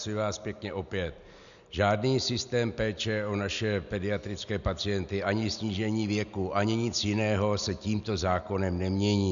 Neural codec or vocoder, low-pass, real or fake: none; 7.2 kHz; real